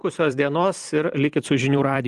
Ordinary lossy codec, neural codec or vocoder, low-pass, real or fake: Opus, 24 kbps; vocoder, 44.1 kHz, 128 mel bands every 256 samples, BigVGAN v2; 14.4 kHz; fake